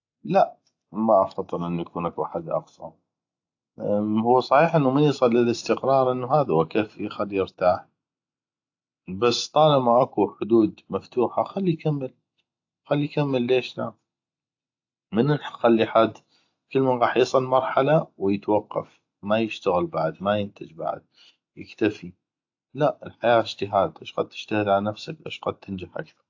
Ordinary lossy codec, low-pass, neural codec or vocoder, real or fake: AAC, 48 kbps; 7.2 kHz; none; real